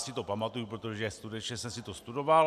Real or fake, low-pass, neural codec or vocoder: real; 14.4 kHz; none